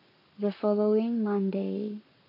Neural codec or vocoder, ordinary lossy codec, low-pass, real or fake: codec, 44.1 kHz, 7.8 kbps, Pupu-Codec; none; 5.4 kHz; fake